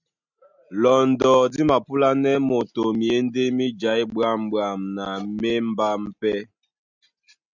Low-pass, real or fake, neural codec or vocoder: 7.2 kHz; real; none